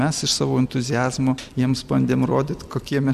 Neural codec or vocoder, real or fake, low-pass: none; real; 14.4 kHz